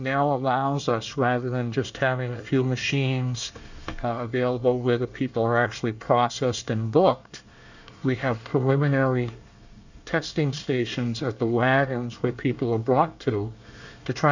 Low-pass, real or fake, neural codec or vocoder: 7.2 kHz; fake; codec, 24 kHz, 1 kbps, SNAC